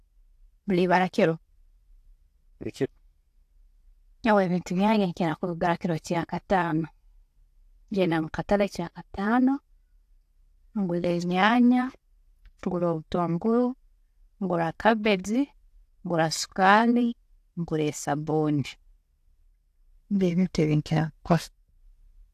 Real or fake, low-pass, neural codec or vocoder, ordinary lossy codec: real; 14.4 kHz; none; AAC, 64 kbps